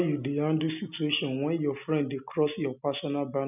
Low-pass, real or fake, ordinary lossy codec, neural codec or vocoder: 3.6 kHz; real; none; none